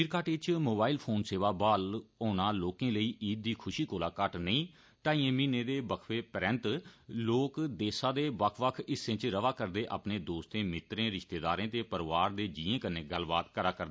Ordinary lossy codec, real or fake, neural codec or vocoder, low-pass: none; real; none; none